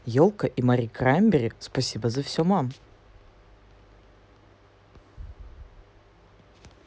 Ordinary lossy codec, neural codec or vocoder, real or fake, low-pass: none; none; real; none